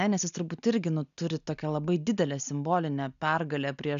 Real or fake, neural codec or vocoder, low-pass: real; none; 7.2 kHz